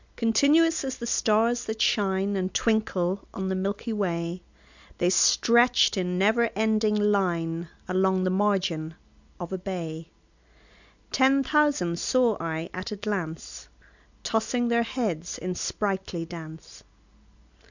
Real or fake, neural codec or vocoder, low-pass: real; none; 7.2 kHz